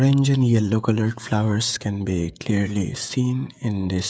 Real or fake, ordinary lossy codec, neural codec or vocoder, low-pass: fake; none; codec, 16 kHz, 16 kbps, FreqCodec, smaller model; none